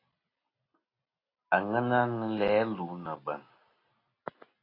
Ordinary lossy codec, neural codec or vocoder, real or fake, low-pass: AAC, 24 kbps; none; real; 5.4 kHz